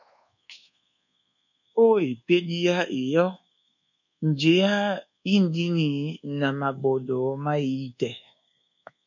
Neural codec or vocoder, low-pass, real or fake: codec, 24 kHz, 1.2 kbps, DualCodec; 7.2 kHz; fake